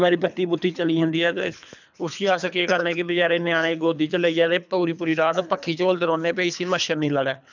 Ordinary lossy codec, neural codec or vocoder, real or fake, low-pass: none; codec, 24 kHz, 3 kbps, HILCodec; fake; 7.2 kHz